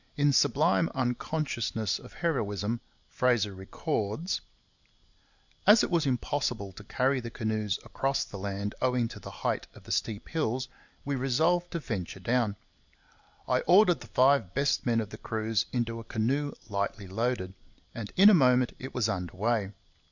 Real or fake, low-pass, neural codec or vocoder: real; 7.2 kHz; none